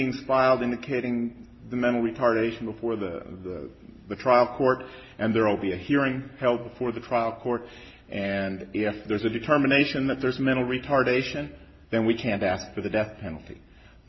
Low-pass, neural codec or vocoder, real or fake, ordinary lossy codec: 7.2 kHz; none; real; MP3, 24 kbps